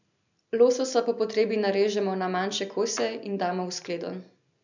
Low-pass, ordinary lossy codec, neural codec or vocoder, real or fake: 7.2 kHz; none; none; real